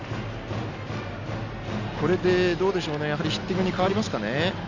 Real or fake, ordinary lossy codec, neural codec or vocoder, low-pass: real; none; none; 7.2 kHz